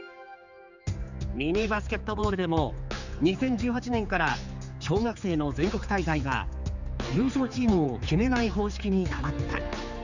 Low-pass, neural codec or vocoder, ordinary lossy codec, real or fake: 7.2 kHz; codec, 16 kHz, 4 kbps, X-Codec, HuBERT features, trained on general audio; none; fake